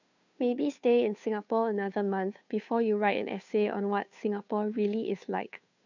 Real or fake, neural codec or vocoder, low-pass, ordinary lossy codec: fake; codec, 16 kHz, 2 kbps, FunCodec, trained on Chinese and English, 25 frames a second; 7.2 kHz; none